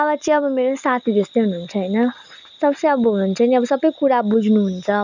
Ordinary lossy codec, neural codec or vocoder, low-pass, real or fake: none; none; 7.2 kHz; real